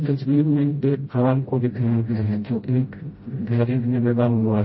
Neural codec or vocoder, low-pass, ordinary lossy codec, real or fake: codec, 16 kHz, 0.5 kbps, FreqCodec, smaller model; 7.2 kHz; MP3, 24 kbps; fake